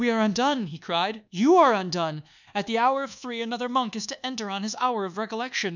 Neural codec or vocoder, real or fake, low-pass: codec, 24 kHz, 1.2 kbps, DualCodec; fake; 7.2 kHz